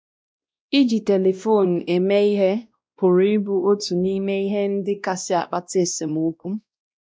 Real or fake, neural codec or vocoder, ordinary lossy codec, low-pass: fake; codec, 16 kHz, 1 kbps, X-Codec, WavLM features, trained on Multilingual LibriSpeech; none; none